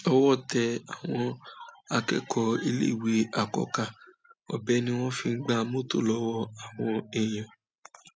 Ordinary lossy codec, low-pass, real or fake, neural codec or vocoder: none; none; real; none